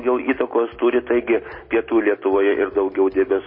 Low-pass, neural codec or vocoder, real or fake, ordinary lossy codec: 5.4 kHz; none; real; MP3, 24 kbps